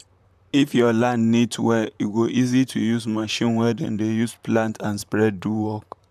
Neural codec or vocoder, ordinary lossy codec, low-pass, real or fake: vocoder, 44.1 kHz, 128 mel bands, Pupu-Vocoder; none; 14.4 kHz; fake